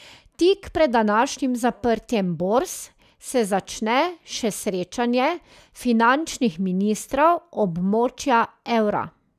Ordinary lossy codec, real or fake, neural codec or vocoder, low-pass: none; real; none; 14.4 kHz